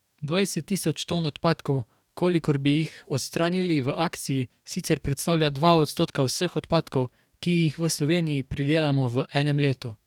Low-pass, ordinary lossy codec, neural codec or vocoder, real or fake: 19.8 kHz; none; codec, 44.1 kHz, 2.6 kbps, DAC; fake